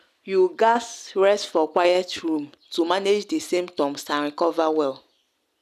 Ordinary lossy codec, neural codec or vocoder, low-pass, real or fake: none; none; 14.4 kHz; real